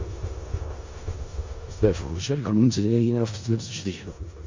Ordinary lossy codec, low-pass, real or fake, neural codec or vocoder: MP3, 48 kbps; 7.2 kHz; fake; codec, 16 kHz in and 24 kHz out, 0.4 kbps, LongCat-Audio-Codec, four codebook decoder